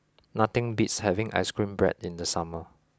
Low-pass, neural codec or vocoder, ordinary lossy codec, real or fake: none; none; none; real